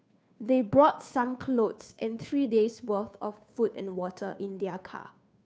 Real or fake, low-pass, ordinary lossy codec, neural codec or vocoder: fake; none; none; codec, 16 kHz, 2 kbps, FunCodec, trained on Chinese and English, 25 frames a second